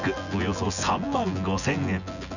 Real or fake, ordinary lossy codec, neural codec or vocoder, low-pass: fake; none; vocoder, 24 kHz, 100 mel bands, Vocos; 7.2 kHz